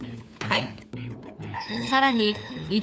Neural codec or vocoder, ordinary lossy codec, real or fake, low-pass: codec, 16 kHz, 4 kbps, FunCodec, trained on LibriTTS, 50 frames a second; none; fake; none